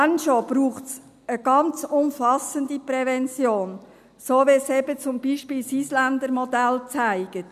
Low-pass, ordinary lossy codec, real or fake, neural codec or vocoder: 14.4 kHz; none; real; none